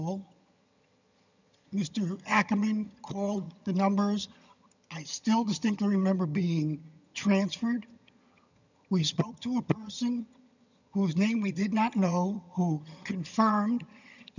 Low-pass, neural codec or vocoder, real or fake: 7.2 kHz; vocoder, 22.05 kHz, 80 mel bands, HiFi-GAN; fake